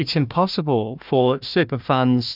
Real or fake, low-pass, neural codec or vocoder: fake; 5.4 kHz; codec, 16 kHz, 1 kbps, FunCodec, trained on Chinese and English, 50 frames a second